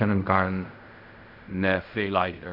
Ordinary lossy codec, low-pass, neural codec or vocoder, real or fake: none; 5.4 kHz; codec, 16 kHz in and 24 kHz out, 0.4 kbps, LongCat-Audio-Codec, fine tuned four codebook decoder; fake